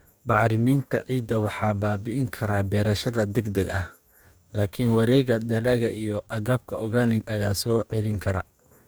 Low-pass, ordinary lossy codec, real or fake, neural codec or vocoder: none; none; fake; codec, 44.1 kHz, 2.6 kbps, DAC